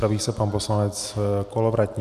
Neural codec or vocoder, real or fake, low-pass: none; real; 14.4 kHz